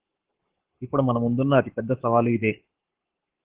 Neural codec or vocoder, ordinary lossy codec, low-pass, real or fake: codec, 44.1 kHz, 7.8 kbps, Pupu-Codec; Opus, 16 kbps; 3.6 kHz; fake